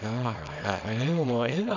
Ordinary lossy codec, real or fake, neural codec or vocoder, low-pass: none; fake; codec, 24 kHz, 0.9 kbps, WavTokenizer, small release; 7.2 kHz